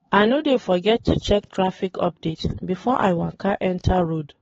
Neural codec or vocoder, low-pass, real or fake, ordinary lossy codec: codec, 16 kHz, 4 kbps, X-Codec, HuBERT features, trained on LibriSpeech; 7.2 kHz; fake; AAC, 24 kbps